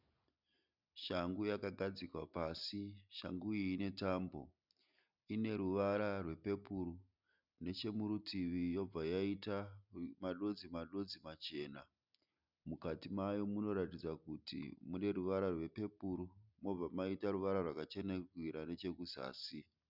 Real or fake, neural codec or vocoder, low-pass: real; none; 5.4 kHz